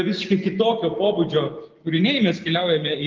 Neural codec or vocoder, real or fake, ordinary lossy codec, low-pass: none; real; Opus, 32 kbps; 7.2 kHz